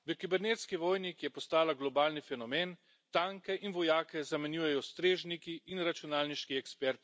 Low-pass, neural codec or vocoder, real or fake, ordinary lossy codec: none; none; real; none